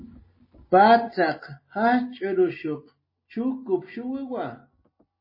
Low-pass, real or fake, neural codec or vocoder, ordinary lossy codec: 5.4 kHz; real; none; MP3, 24 kbps